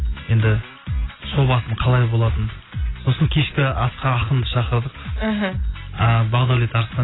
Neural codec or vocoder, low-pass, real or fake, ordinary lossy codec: none; 7.2 kHz; real; AAC, 16 kbps